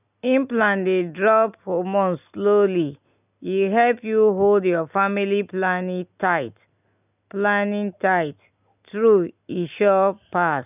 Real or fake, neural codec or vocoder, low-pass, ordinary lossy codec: real; none; 3.6 kHz; none